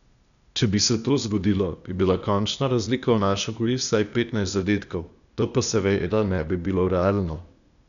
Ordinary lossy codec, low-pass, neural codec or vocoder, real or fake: none; 7.2 kHz; codec, 16 kHz, 0.8 kbps, ZipCodec; fake